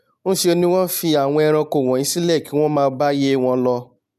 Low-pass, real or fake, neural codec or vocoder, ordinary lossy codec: 14.4 kHz; real; none; none